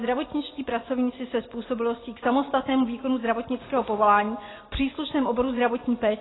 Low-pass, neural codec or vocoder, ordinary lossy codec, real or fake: 7.2 kHz; none; AAC, 16 kbps; real